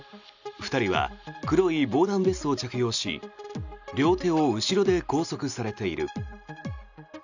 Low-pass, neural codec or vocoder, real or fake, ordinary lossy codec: 7.2 kHz; none; real; none